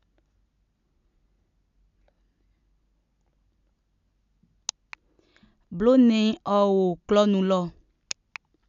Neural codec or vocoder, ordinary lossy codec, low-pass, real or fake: none; none; 7.2 kHz; real